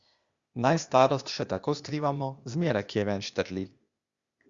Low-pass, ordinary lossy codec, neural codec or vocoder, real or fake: 7.2 kHz; Opus, 64 kbps; codec, 16 kHz, 0.8 kbps, ZipCodec; fake